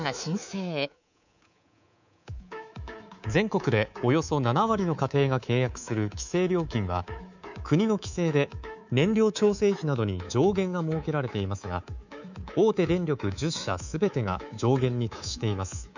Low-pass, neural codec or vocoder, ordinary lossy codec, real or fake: 7.2 kHz; codec, 24 kHz, 3.1 kbps, DualCodec; none; fake